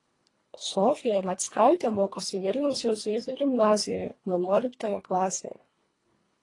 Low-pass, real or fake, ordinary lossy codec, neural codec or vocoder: 10.8 kHz; fake; AAC, 32 kbps; codec, 24 kHz, 1.5 kbps, HILCodec